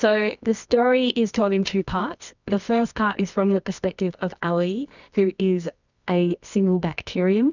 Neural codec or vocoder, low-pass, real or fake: codec, 24 kHz, 0.9 kbps, WavTokenizer, medium music audio release; 7.2 kHz; fake